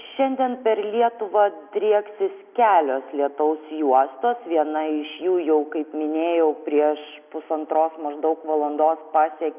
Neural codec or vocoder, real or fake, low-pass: none; real; 3.6 kHz